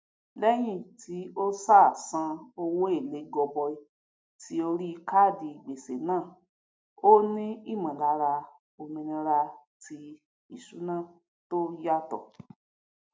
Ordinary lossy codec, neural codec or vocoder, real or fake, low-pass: none; none; real; none